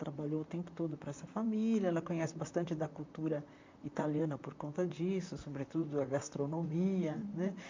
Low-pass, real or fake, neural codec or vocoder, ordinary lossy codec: 7.2 kHz; fake; vocoder, 44.1 kHz, 128 mel bands, Pupu-Vocoder; MP3, 48 kbps